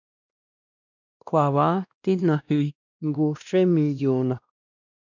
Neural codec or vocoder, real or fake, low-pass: codec, 16 kHz, 1 kbps, X-Codec, WavLM features, trained on Multilingual LibriSpeech; fake; 7.2 kHz